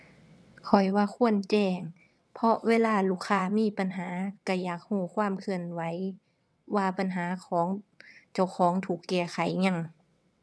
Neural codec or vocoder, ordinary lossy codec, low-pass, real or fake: vocoder, 22.05 kHz, 80 mel bands, WaveNeXt; none; none; fake